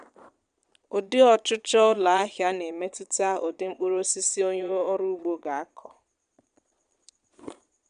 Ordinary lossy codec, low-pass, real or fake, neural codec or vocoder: none; 9.9 kHz; fake; vocoder, 22.05 kHz, 80 mel bands, Vocos